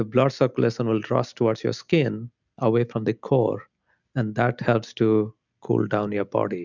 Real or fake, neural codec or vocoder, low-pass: real; none; 7.2 kHz